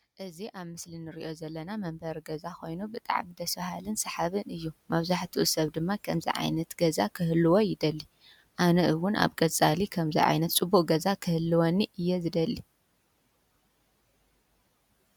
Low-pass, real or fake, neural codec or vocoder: 19.8 kHz; real; none